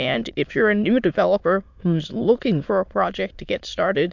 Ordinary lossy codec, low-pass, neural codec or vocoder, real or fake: MP3, 64 kbps; 7.2 kHz; autoencoder, 22.05 kHz, a latent of 192 numbers a frame, VITS, trained on many speakers; fake